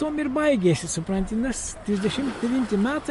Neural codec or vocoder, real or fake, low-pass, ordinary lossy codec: none; real; 14.4 kHz; MP3, 48 kbps